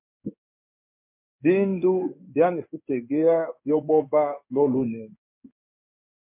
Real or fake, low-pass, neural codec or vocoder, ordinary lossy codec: fake; 3.6 kHz; codec, 16 kHz in and 24 kHz out, 1 kbps, XY-Tokenizer; MP3, 32 kbps